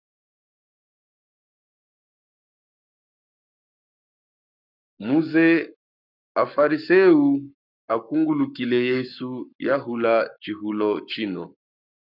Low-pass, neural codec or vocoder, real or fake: 5.4 kHz; codec, 44.1 kHz, 7.8 kbps, Pupu-Codec; fake